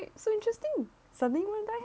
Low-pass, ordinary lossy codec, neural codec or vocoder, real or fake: none; none; none; real